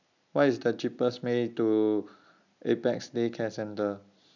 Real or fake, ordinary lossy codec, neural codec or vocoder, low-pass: real; none; none; 7.2 kHz